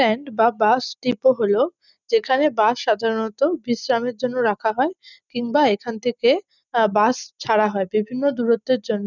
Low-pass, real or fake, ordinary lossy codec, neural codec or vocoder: 7.2 kHz; real; none; none